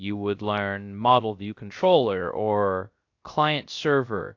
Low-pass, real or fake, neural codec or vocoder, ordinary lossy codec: 7.2 kHz; fake; codec, 16 kHz, 0.3 kbps, FocalCodec; AAC, 48 kbps